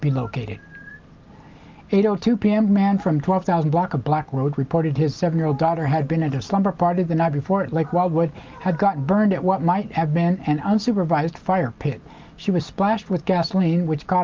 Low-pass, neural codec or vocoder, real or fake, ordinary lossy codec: 7.2 kHz; none; real; Opus, 32 kbps